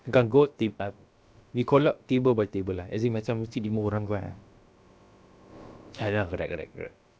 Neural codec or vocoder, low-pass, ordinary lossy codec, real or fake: codec, 16 kHz, about 1 kbps, DyCAST, with the encoder's durations; none; none; fake